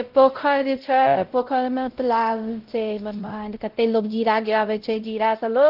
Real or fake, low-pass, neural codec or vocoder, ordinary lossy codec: fake; 5.4 kHz; codec, 16 kHz, 0.5 kbps, X-Codec, WavLM features, trained on Multilingual LibriSpeech; Opus, 16 kbps